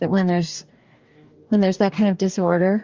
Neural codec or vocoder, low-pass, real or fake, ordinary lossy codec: codec, 44.1 kHz, 2.6 kbps, DAC; 7.2 kHz; fake; Opus, 32 kbps